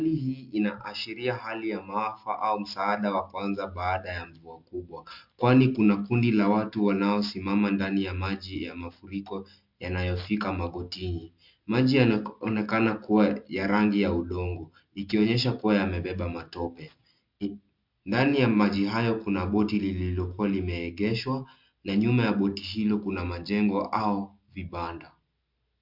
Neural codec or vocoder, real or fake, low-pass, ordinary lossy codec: none; real; 5.4 kHz; MP3, 48 kbps